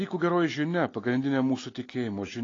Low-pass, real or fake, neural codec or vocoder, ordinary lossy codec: 7.2 kHz; real; none; AAC, 32 kbps